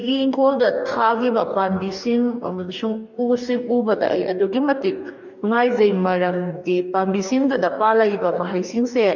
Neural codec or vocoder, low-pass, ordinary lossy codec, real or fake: codec, 44.1 kHz, 2.6 kbps, DAC; 7.2 kHz; none; fake